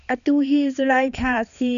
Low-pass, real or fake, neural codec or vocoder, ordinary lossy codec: 7.2 kHz; fake; codec, 16 kHz, 2 kbps, X-Codec, HuBERT features, trained on balanced general audio; MP3, 96 kbps